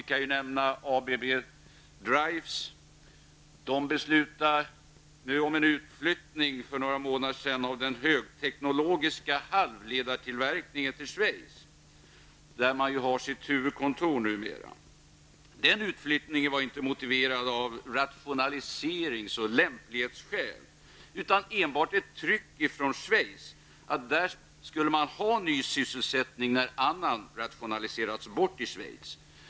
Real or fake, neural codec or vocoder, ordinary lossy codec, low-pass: real; none; none; none